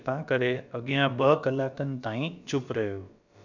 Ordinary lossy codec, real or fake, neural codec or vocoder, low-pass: none; fake; codec, 16 kHz, about 1 kbps, DyCAST, with the encoder's durations; 7.2 kHz